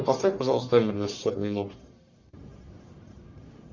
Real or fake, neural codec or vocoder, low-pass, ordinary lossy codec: fake; codec, 44.1 kHz, 1.7 kbps, Pupu-Codec; 7.2 kHz; Opus, 64 kbps